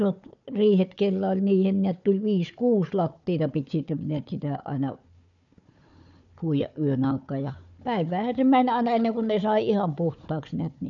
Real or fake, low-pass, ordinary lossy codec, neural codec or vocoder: fake; 7.2 kHz; none; codec, 16 kHz, 4 kbps, FunCodec, trained on Chinese and English, 50 frames a second